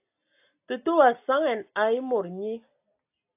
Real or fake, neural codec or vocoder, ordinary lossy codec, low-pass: real; none; AAC, 32 kbps; 3.6 kHz